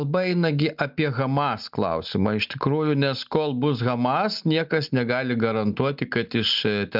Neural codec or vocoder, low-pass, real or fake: none; 5.4 kHz; real